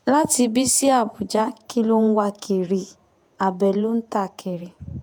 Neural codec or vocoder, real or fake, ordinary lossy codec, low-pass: vocoder, 48 kHz, 128 mel bands, Vocos; fake; none; none